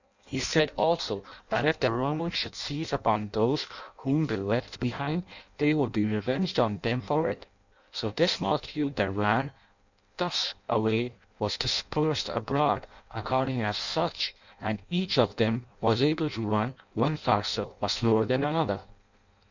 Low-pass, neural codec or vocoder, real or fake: 7.2 kHz; codec, 16 kHz in and 24 kHz out, 0.6 kbps, FireRedTTS-2 codec; fake